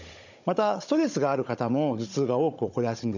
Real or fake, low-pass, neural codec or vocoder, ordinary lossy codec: fake; 7.2 kHz; codec, 16 kHz, 16 kbps, FunCodec, trained on Chinese and English, 50 frames a second; none